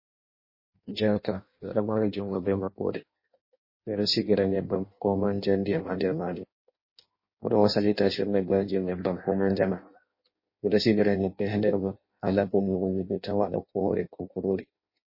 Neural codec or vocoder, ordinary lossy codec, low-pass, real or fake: codec, 16 kHz in and 24 kHz out, 0.6 kbps, FireRedTTS-2 codec; MP3, 24 kbps; 5.4 kHz; fake